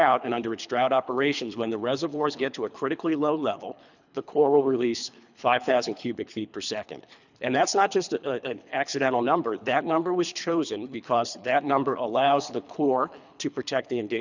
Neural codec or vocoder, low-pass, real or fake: codec, 24 kHz, 3 kbps, HILCodec; 7.2 kHz; fake